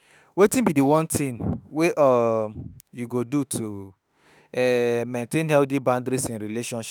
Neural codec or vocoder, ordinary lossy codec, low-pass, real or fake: autoencoder, 48 kHz, 32 numbers a frame, DAC-VAE, trained on Japanese speech; none; none; fake